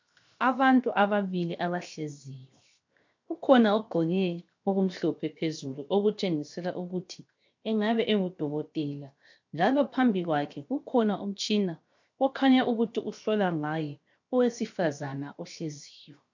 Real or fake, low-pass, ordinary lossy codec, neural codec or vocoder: fake; 7.2 kHz; MP3, 48 kbps; codec, 16 kHz, 0.7 kbps, FocalCodec